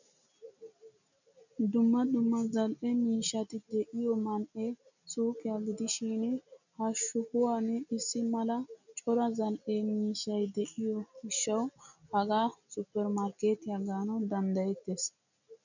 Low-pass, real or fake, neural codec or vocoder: 7.2 kHz; real; none